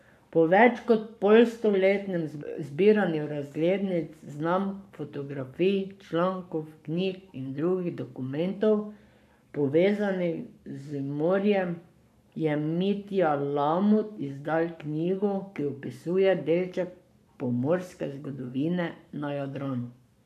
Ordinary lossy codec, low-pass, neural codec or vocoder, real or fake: none; 14.4 kHz; codec, 44.1 kHz, 7.8 kbps, Pupu-Codec; fake